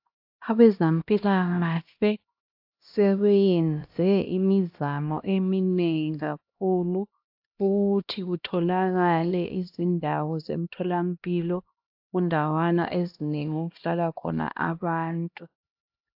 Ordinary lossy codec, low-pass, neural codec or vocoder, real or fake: AAC, 48 kbps; 5.4 kHz; codec, 16 kHz, 1 kbps, X-Codec, HuBERT features, trained on LibriSpeech; fake